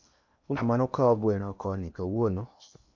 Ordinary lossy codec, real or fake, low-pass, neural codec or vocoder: none; fake; 7.2 kHz; codec, 16 kHz in and 24 kHz out, 0.8 kbps, FocalCodec, streaming, 65536 codes